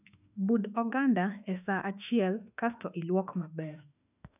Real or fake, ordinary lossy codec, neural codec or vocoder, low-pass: fake; none; autoencoder, 48 kHz, 32 numbers a frame, DAC-VAE, trained on Japanese speech; 3.6 kHz